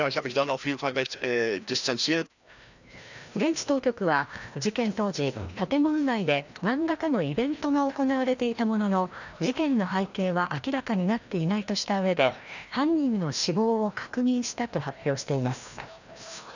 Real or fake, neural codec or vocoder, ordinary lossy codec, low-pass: fake; codec, 16 kHz, 1 kbps, FreqCodec, larger model; none; 7.2 kHz